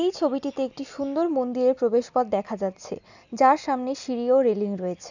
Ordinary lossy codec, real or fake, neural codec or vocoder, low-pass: none; real; none; 7.2 kHz